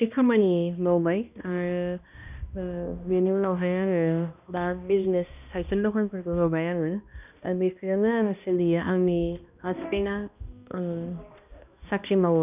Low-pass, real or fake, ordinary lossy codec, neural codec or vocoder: 3.6 kHz; fake; none; codec, 16 kHz, 1 kbps, X-Codec, HuBERT features, trained on balanced general audio